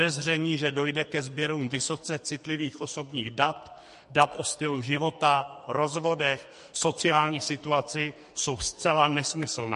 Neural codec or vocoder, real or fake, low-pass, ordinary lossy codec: codec, 44.1 kHz, 2.6 kbps, SNAC; fake; 14.4 kHz; MP3, 48 kbps